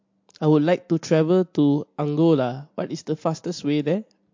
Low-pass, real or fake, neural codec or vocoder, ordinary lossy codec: 7.2 kHz; real; none; MP3, 48 kbps